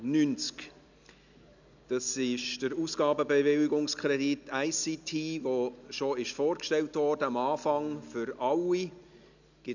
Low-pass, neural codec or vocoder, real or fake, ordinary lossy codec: 7.2 kHz; none; real; none